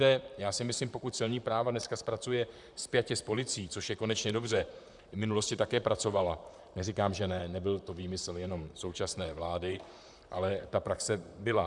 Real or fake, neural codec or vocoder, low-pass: fake; vocoder, 44.1 kHz, 128 mel bands, Pupu-Vocoder; 10.8 kHz